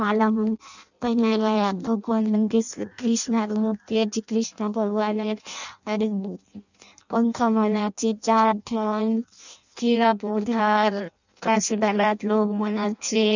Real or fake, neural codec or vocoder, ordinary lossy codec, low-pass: fake; codec, 16 kHz in and 24 kHz out, 0.6 kbps, FireRedTTS-2 codec; none; 7.2 kHz